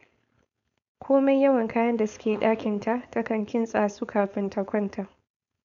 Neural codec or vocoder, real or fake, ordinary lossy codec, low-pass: codec, 16 kHz, 4.8 kbps, FACodec; fake; none; 7.2 kHz